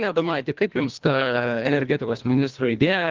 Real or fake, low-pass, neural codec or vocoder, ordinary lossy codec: fake; 7.2 kHz; codec, 24 kHz, 1.5 kbps, HILCodec; Opus, 32 kbps